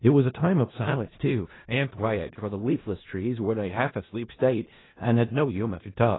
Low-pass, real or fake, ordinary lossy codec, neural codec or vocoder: 7.2 kHz; fake; AAC, 16 kbps; codec, 16 kHz in and 24 kHz out, 0.4 kbps, LongCat-Audio-Codec, four codebook decoder